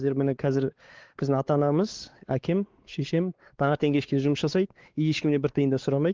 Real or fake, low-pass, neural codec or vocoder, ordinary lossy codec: fake; 7.2 kHz; codec, 16 kHz, 4 kbps, X-Codec, WavLM features, trained on Multilingual LibriSpeech; Opus, 16 kbps